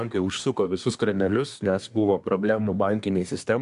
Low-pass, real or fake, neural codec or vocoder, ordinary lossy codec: 10.8 kHz; fake; codec, 24 kHz, 1 kbps, SNAC; MP3, 96 kbps